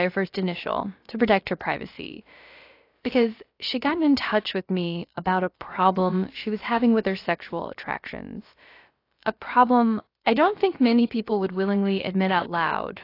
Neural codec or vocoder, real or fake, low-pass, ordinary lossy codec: codec, 16 kHz, about 1 kbps, DyCAST, with the encoder's durations; fake; 5.4 kHz; AAC, 32 kbps